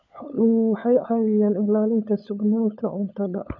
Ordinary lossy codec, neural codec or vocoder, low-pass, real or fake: none; codec, 16 kHz, 16 kbps, FunCodec, trained on LibriTTS, 50 frames a second; 7.2 kHz; fake